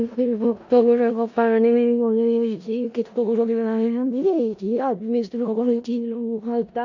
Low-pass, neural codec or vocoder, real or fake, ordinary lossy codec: 7.2 kHz; codec, 16 kHz in and 24 kHz out, 0.4 kbps, LongCat-Audio-Codec, four codebook decoder; fake; AAC, 48 kbps